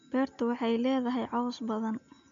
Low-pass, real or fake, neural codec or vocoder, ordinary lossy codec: 7.2 kHz; real; none; MP3, 64 kbps